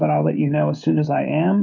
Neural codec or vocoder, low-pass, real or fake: codec, 16 kHz, 16 kbps, FreqCodec, smaller model; 7.2 kHz; fake